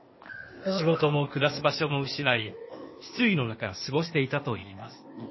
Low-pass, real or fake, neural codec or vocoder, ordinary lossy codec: 7.2 kHz; fake; codec, 16 kHz, 0.8 kbps, ZipCodec; MP3, 24 kbps